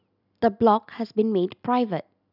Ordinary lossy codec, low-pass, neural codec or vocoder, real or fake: none; 5.4 kHz; none; real